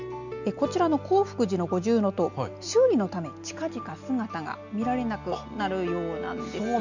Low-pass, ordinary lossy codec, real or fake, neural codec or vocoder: 7.2 kHz; none; real; none